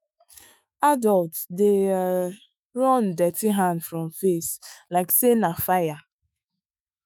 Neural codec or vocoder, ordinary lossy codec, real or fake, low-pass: autoencoder, 48 kHz, 128 numbers a frame, DAC-VAE, trained on Japanese speech; none; fake; none